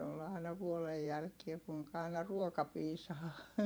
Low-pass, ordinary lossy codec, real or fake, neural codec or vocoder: none; none; fake; vocoder, 44.1 kHz, 128 mel bands every 512 samples, BigVGAN v2